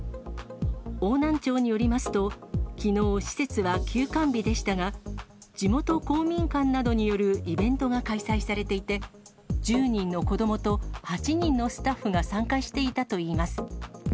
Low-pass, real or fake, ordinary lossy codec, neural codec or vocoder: none; real; none; none